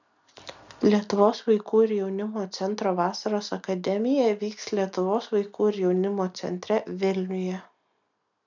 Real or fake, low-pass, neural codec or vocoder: real; 7.2 kHz; none